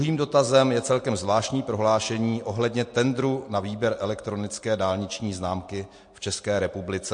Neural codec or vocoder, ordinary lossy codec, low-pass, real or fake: none; MP3, 48 kbps; 9.9 kHz; real